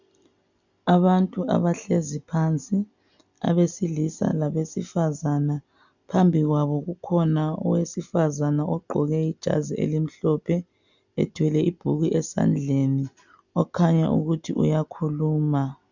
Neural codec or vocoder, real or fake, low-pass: none; real; 7.2 kHz